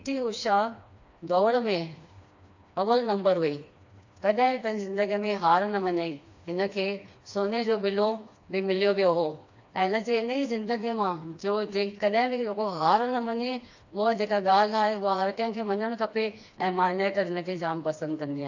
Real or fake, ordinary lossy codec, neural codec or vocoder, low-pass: fake; AAC, 48 kbps; codec, 16 kHz, 2 kbps, FreqCodec, smaller model; 7.2 kHz